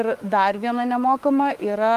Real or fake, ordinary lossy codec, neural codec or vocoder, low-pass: fake; Opus, 32 kbps; autoencoder, 48 kHz, 32 numbers a frame, DAC-VAE, trained on Japanese speech; 14.4 kHz